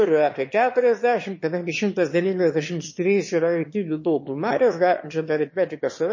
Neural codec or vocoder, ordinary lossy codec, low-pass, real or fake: autoencoder, 22.05 kHz, a latent of 192 numbers a frame, VITS, trained on one speaker; MP3, 32 kbps; 7.2 kHz; fake